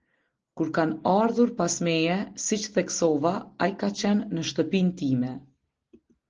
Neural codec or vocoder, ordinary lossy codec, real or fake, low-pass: none; Opus, 16 kbps; real; 7.2 kHz